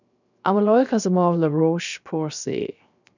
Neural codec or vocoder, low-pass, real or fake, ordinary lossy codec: codec, 16 kHz, 0.7 kbps, FocalCodec; 7.2 kHz; fake; none